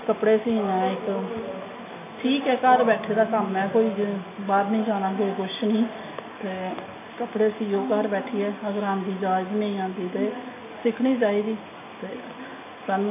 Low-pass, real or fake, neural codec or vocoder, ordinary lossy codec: 3.6 kHz; real; none; AAC, 24 kbps